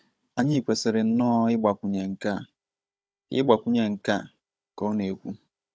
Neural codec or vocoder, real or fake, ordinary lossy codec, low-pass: codec, 16 kHz, 16 kbps, FunCodec, trained on Chinese and English, 50 frames a second; fake; none; none